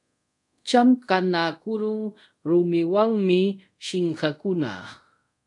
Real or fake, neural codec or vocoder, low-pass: fake; codec, 24 kHz, 0.5 kbps, DualCodec; 10.8 kHz